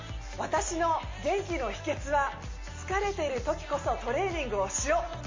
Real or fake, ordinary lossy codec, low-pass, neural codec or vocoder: real; MP3, 32 kbps; 7.2 kHz; none